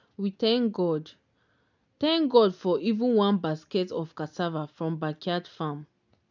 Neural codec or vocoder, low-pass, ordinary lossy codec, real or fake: none; 7.2 kHz; none; real